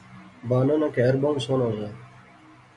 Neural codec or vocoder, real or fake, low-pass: none; real; 10.8 kHz